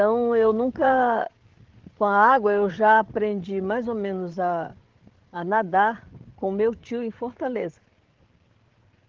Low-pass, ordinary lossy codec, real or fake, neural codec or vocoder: 7.2 kHz; Opus, 16 kbps; fake; codec, 16 kHz, 8 kbps, FreqCodec, larger model